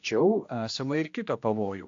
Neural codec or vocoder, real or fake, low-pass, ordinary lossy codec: codec, 16 kHz, 1 kbps, X-Codec, HuBERT features, trained on general audio; fake; 7.2 kHz; AAC, 48 kbps